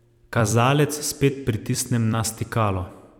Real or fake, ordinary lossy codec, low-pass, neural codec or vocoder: fake; none; 19.8 kHz; vocoder, 44.1 kHz, 128 mel bands every 256 samples, BigVGAN v2